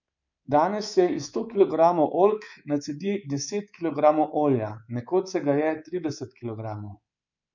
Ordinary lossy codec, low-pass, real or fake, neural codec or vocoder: none; 7.2 kHz; fake; codec, 24 kHz, 3.1 kbps, DualCodec